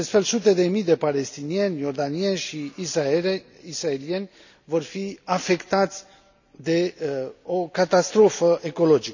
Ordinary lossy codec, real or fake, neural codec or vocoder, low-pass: none; real; none; 7.2 kHz